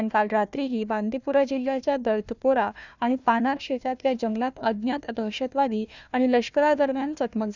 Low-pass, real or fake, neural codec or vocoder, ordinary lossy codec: 7.2 kHz; fake; codec, 16 kHz, 1 kbps, FunCodec, trained on Chinese and English, 50 frames a second; none